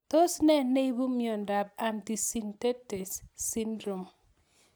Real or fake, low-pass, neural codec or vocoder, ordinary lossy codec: real; none; none; none